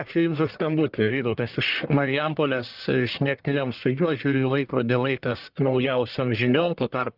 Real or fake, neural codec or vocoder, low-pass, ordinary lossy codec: fake; codec, 44.1 kHz, 1.7 kbps, Pupu-Codec; 5.4 kHz; Opus, 24 kbps